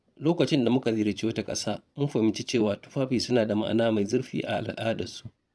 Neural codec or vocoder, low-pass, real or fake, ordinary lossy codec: vocoder, 22.05 kHz, 80 mel bands, Vocos; none; fake; none